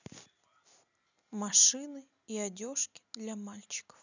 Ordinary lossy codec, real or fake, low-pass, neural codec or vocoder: none; real; 7.2 kHz; none